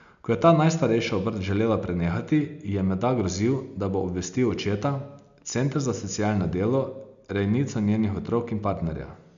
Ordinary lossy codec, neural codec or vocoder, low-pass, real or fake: none; none; 7.2 kHz; real